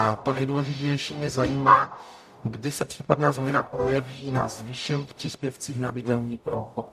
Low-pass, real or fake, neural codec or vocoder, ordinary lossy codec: 14.4 kHz; fake; codec, 44.1 kHz, 0.9 kbps, DAC; AAC, 96 kbps